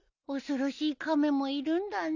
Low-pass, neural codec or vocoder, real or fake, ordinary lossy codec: 7.2 kHz; none; real; none